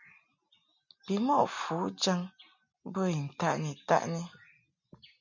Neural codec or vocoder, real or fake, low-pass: none; real; 7.2 kHz